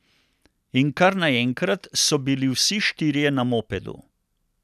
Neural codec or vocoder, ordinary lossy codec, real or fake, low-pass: none; none; real; 14.4 kHz